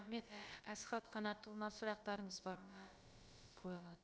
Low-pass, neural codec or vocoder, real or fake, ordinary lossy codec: none; codec, 16 kHz, about 1 kbps, DyCAST, with the encoder's durations; fake; none